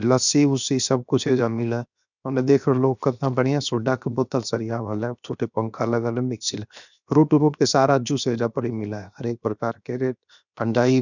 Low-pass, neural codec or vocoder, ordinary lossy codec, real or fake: 7.2 kHz; codec, 16 kHz, 0.7 kbps, FocalCodec; none; fake